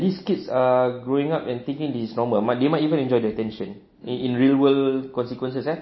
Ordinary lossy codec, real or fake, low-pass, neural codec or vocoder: MP3, 24 kbps; real; 7.2 kHz; none